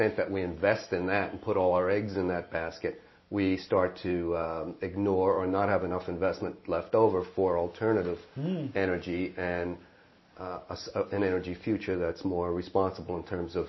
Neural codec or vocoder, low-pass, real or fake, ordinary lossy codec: none; 7.2 kHz; real; MP3, 24 kbps